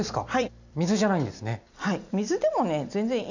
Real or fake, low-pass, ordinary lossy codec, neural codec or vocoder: fake; 7.2 kHz; none; vocoder, 44.1 kHz, 128 mel bands every 256 samples, BigVGAN v2